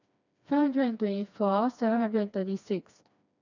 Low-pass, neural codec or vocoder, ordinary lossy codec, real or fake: 7.2 kHz; codec, 16 kHz, 2 kbps, FreqCodec, smaller model; none; fake